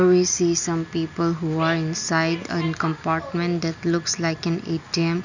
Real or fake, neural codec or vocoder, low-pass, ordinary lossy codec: real; none; 7.2 kHz; none